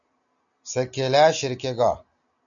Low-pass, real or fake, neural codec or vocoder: 7.2 kHz; real; none